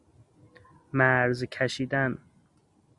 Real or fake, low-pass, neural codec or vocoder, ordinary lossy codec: real; 10.8 kHz; none; Opus, 64 kbps